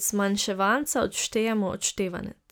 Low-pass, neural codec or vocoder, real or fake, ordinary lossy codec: none; none; real; none